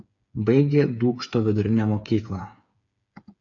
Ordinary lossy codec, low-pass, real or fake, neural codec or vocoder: AAC, 64 kbps; 7.2 kHz; fake; codec, 16 kHz, 8 kbps, FreqCodec, smaller model